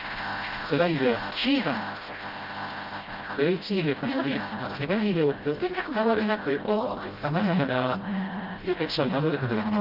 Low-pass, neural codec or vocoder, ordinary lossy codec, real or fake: 5.4 kHz; codec, 16 kHz, 0.5 kbps, FreqCodec, smaller model; Opus, 32 kbps; fake